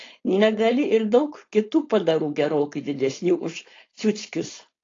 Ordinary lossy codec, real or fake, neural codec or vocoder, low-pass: AAC, 32 kbps; fake; codec, 16 kHz, 4.8 kbps, FACodec; 7.2 kHz